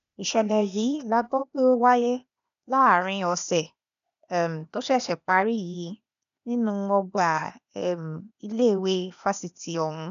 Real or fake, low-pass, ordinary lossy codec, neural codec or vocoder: fake; 7.2 kHz; none; codec, 16 kHz, 0.8 kbps, ZipCodec